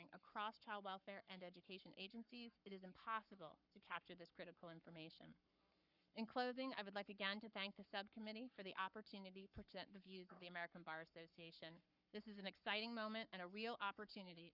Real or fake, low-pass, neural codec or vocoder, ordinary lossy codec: fake; 5.4 kHz; codec, 44.1 kHz, 7.8 kbps, Pupu-Codec; Opus, 64 kbps